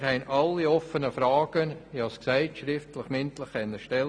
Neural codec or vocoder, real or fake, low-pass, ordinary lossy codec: none; real; 9.9 kHz; none